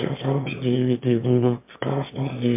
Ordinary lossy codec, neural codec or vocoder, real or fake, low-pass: MP3, 32 kbps; autoencoder, 22.05 kHz, a latent of 192 numbers a frame, VITS, trained on one speaker; fake; 3.6 kHz